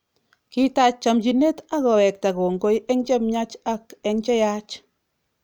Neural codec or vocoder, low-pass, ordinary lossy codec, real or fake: none; none; none; real